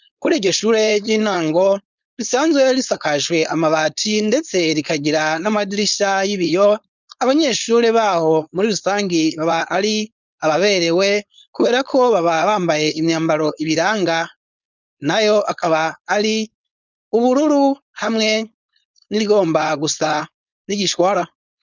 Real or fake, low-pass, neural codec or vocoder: fake; 7.2 kHz; codec, 16 kHz, 4.8 kbps, FACodec